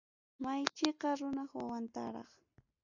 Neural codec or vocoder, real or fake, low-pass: none; real; 7.2 kHz